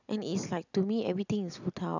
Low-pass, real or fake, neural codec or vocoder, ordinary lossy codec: 7.2 kHz; real; none; none